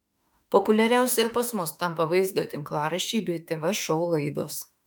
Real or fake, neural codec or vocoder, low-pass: fake; autoencoder, 48 kHz, 32 numbers a frame, DAC-VAE, trained on Japanese speech; 19.8 kHz